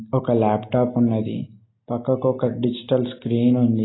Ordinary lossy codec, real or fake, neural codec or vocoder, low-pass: AAC, 16 kbps; real; none; 7.2 kHz